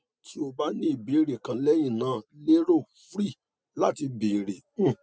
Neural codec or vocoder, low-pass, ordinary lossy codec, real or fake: none; none; none; real